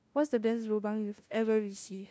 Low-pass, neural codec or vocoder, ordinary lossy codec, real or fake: none; codec, 16 kHz, 0.5 kbps, FunCodec, trained on LibriTTS, 25 frames a second; none; fake